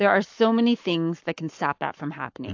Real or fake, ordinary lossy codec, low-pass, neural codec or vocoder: fake; AAC, 48 kbps; 7.2 kHz; codec, 44.1 kHz, 7.8 kbps, Pupu-Codec